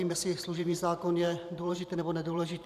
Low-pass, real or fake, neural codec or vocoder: 14.4 kHz; fake; vocoder, 44.1 kHz, 128 mel bands every 512 samples, BigVGAN v2